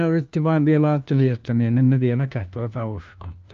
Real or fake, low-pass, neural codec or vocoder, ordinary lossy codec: fake; 7.2 kHz; codec, 16 kHz, 1 kbps, FunCodec, trained on LibriTTS, 50 frames a second; Opus, 24 kbps